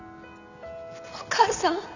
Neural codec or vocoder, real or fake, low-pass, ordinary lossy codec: none; real; 7.2 kHz; none